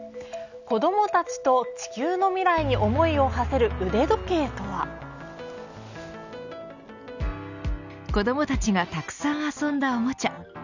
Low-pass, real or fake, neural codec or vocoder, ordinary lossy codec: 7.2 kHz; real; none; none